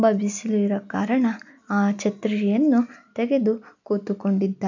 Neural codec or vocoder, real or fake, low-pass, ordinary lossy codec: none; real; 7.2 kHz; none